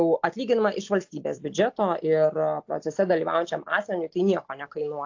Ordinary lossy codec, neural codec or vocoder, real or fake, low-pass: AAC, 48 kbps; none; real; 7.2 kHz